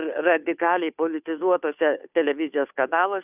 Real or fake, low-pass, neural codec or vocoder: fake; 3.6 kHz; codec, 16 kHz, 2 kbps, FunCodec, trained on Chinese and English, 25 frames a second